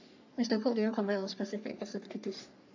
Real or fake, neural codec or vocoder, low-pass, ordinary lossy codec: fake; codec, 44.1 kHz, 3.4 kbps, Pupu-Codec; 7.2 kHz; AAC, 48 kbps